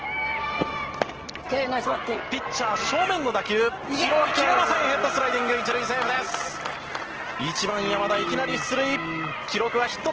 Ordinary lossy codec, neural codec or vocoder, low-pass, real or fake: Opus, 16 kbps; none; 7.2 kHz; real